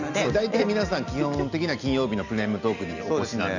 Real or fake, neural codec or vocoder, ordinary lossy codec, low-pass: real; none; none; 7.2 kHz